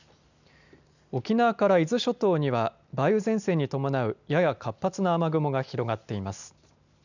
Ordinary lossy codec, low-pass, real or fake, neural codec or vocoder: none; 7.2 kHz; real; none